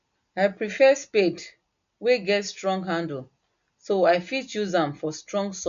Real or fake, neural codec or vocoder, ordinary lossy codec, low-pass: real; none; MP3, 64 kbps; 7.2 kHz